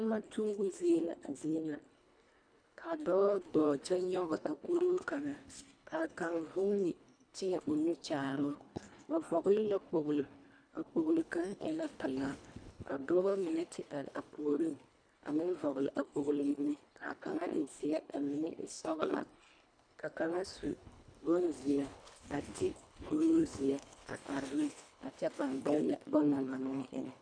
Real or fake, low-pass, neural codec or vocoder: fake; 9.9 kHz; codec, 24 kHz, 1.5 kbps, HILCodec